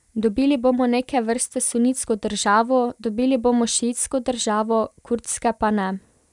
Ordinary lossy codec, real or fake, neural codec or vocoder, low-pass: none; real; none; 10.8 kHz